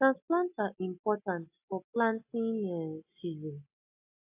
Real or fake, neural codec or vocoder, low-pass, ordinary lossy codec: real; none; 3.6 kHz; AAC, 32 kbps